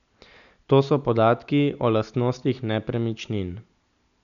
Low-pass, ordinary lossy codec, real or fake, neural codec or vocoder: 7.2 kHz; none; real; none